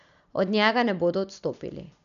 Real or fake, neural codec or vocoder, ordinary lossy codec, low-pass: real; none; none; 7.2 kHz